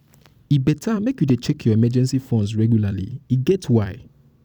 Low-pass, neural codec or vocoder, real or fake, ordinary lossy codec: 19.8 kHz; vocoder, 44.1 kHz, 128 mel bands every 256 samples, BigVGAN v2; fake; none